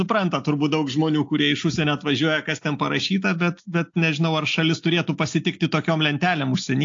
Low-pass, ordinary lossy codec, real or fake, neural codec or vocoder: 7.2 kHz; AAC, 48 kbps; real; none